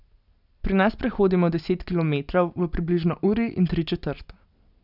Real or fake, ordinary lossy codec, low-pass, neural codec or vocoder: real; none; 5.4 kHz; none